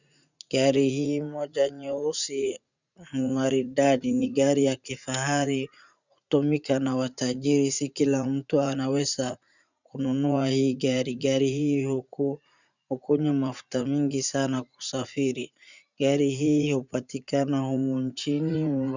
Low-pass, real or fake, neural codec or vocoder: 7.2 kHz; fake; vocoder, 44.1 kHz, 80 mel bands, Vocos